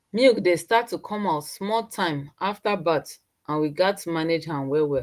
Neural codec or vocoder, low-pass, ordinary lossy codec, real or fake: none; 14.4 kHz; Opus, 24 kbps; real